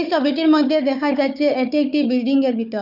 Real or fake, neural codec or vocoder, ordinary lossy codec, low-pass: fake; codec, 16 kHz, 4 kbps, FunCodec, trained on Chinese and English, 50 frames a second; none; 5.4 kHz